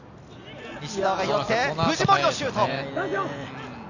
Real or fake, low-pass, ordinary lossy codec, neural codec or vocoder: real; 7.2 kHz; none; none